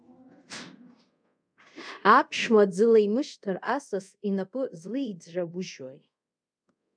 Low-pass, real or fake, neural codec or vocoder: 9.9 kHz; fake; codec, 24 kHz, 0.5 kbps, DualCodec